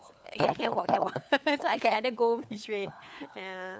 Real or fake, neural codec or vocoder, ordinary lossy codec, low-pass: fake; codec, 16 kHz, 4 kbps, FunCodec, trained on LibriTTS, 50 frames a second; none; none